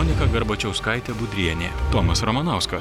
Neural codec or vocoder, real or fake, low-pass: none; real; 19.8 kHz